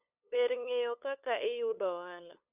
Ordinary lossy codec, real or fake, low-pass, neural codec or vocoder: none; fake; 3.6 kHz; codec, 16 kHz, 8 kbps, FunCodec, trained on LibriTTS, 25 frames a second